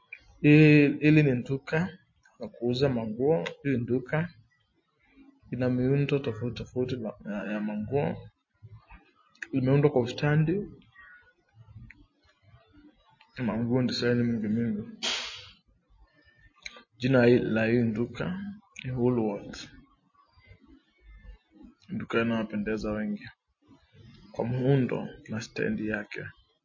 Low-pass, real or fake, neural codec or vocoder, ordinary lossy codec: 7.2 kHz; real; none; MP3, 32 kbps